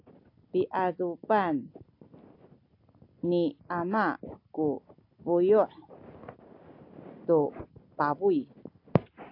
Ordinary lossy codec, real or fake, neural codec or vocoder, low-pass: AAC, 32 kbps; real; none; 5.4 kHz